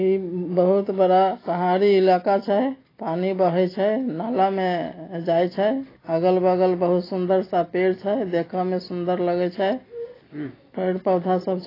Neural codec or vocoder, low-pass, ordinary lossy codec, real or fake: none; 5.4 kHz; AAC, 24 kbps; real